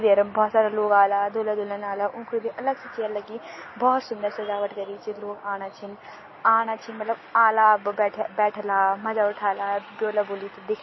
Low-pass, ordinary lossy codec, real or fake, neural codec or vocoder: 7.2 kHz; MP3, 24 kbps; real; none